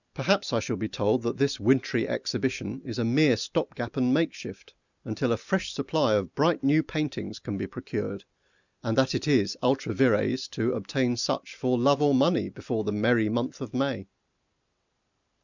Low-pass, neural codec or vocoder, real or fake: 7.2 kHz; none; real